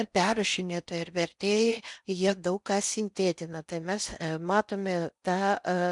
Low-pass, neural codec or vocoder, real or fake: 10.8 kHz; codec, 16 kHz in and 24 kHz out, 0.6 kbps, FocalCodec, streaming, 4096 codes; fake